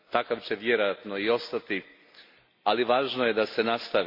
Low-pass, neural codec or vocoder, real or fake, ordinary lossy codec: 5.4 kHz; none; real; MP3, 32 kbps